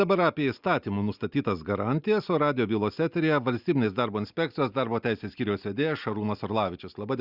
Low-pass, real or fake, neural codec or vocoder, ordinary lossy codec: 5.4 kHz; real; none; Opus, 64 kbps